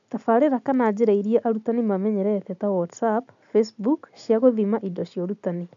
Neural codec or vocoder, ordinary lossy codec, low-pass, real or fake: none; none; 7.2 kHz; real